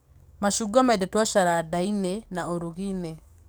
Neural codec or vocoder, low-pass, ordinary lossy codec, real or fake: codec, 44.1 kHz, 7.8 kbps, DAC; none; none; fake